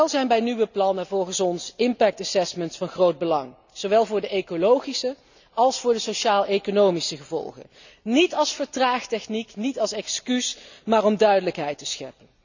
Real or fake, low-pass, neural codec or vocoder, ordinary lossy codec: real; 7.2 kHz; none; none